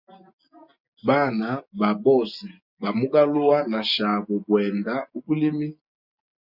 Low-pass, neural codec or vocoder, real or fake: 5.4 kHz; none; real